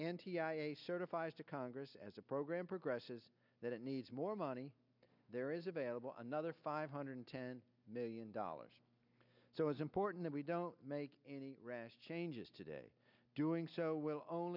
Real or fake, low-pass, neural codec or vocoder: real; 5.4 kHz; none